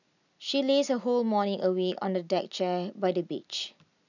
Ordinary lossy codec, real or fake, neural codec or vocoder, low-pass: none; real; none; 7.2 kHz